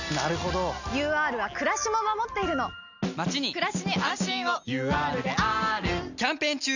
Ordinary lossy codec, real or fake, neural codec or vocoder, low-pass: none; real; none; 7.2 kHz